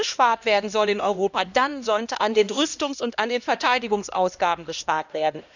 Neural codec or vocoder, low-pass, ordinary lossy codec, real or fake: codec, 16 kHz, 1 kbps, X-Codec, HuBERT features, trained on LibriSpeech; 7.2 kHz; none; fake